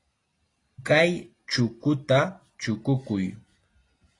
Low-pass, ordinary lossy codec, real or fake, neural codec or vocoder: 10.8 kHz; AAC, 32 kbps; real; none